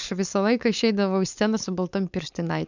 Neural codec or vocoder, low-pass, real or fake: codec, 16 kHz, 4.8 kbps, FACodec; 7.2 kHz; fake